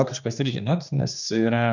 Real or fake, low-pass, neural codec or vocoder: fake; 7.2 kHz; codec, 16 kHz, 2 kbps, X-Codec, HuBERT features, trained on general audio